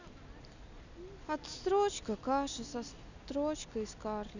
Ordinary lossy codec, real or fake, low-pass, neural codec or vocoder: none; real; 7.2 kHz; none